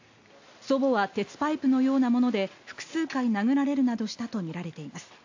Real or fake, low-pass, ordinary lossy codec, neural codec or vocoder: real; 7.2 kHz; AAC, 48 kbps; none